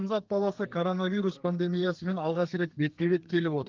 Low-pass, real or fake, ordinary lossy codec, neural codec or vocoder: 7.2 kHz; fake; Opus, 32 kbps; codec, 32 kHz, 1.9 kbps, SNAC